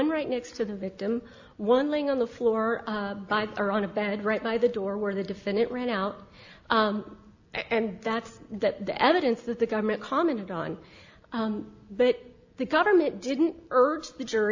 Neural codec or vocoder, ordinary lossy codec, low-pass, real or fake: none; AAC, 48 kbps; 7.2 kHz; real